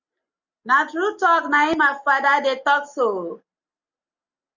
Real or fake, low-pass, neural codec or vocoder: real; 7.2 kHz; none